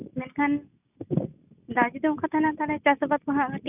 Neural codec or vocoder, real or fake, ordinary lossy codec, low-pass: none; real; none; 3.6 kHz